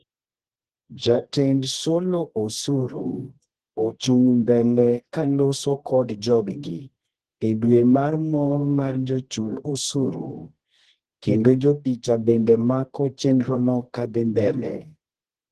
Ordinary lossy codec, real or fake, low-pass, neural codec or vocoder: Opus, 16 kbps; fake; 10.8 kHz; codec, 24 kHz, 0.9 kbps, WavTokenizer, medium music audio release